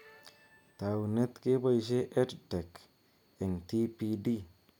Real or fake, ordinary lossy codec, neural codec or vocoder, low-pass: real; none; none; 19.8 kHz